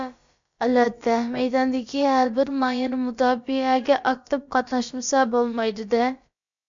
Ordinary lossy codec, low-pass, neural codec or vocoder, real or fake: MP3, 64 kbps; 7.2 kHz; codec, 16 kHz, about 1 kbps, DyCAST, with the encoder's durations; fake